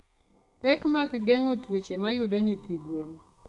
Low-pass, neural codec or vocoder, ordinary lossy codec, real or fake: 10.8 kHz; codec, 32 kHz, 1.9 kbps, SNAC; none; fake